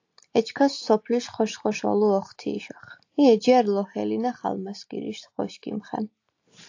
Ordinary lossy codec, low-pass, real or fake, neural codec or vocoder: AAC, 48 kbps; 7.2 kHz; real; none